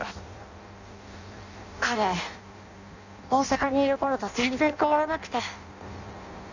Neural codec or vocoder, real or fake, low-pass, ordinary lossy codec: codec, 16 kHz in and 24 kHz out, 0.6 kbps, FireRedTTS-2 codec; fake; 7.2 kHz; none